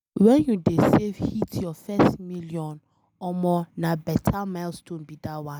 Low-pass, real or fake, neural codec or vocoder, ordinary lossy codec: none; real; none; none